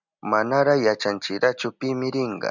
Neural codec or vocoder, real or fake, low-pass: none; real; 7.2 kHz